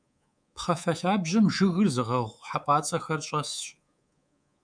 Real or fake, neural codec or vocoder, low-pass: fake; codec, 24 kHz, 3.1 kbps, DualCodec; 9.9 kHz